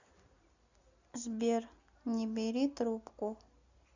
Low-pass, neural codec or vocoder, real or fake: 7.2 kHz; none; real